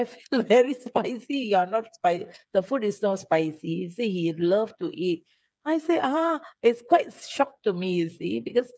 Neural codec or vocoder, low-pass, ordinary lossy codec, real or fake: codec, 16 kHz, 8 kbps, FreqCodec, smaller model; none; none; fake